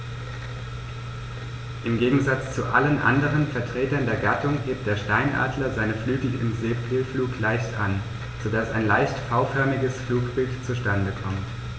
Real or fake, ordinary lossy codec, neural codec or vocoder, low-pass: real; none; none; none